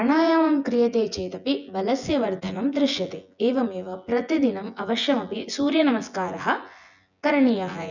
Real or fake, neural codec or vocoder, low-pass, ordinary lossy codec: fake; vocoder, 24 kHz, 100 mel bands, Vocos; 7.2 kHz; none